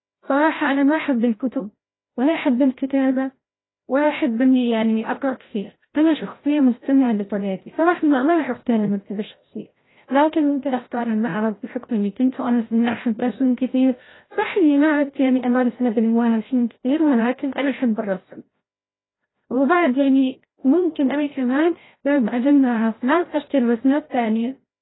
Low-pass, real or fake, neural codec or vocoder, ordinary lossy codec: 7.2 kHz; fake; codec, 16 kHz, 0.5 kbps, FreqCodec, larger model; AAC, 16 kbps